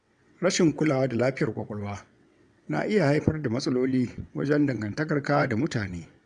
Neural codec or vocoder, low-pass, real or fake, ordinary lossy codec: vocoder, 22.05 kHz, 80 mel bands, WaveNeXt; 9.9 kHz; fake; MP3, 96 kbps